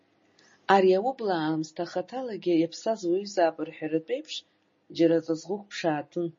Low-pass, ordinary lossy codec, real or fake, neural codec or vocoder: 7.2 kHz; MP3, 32 kbps; real; none